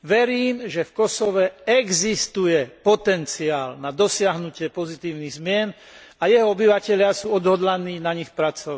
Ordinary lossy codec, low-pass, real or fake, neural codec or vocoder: none; none; real; none